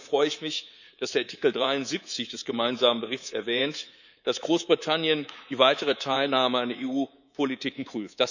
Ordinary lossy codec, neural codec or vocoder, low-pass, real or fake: none; codec, 24 kHz, 3.1 kbps, DualCodec; 7.2 kHz; fake